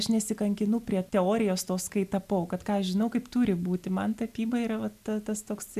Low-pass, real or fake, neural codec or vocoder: 14.4 kHz; real; none